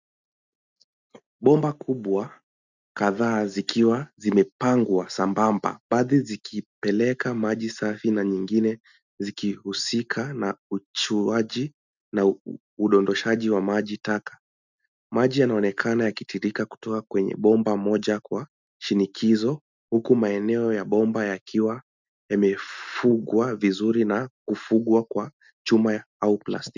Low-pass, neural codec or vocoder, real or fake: 7.2 kHz; none; real